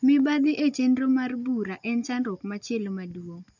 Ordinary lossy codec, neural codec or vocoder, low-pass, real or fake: none; none; 7.2 kHz; real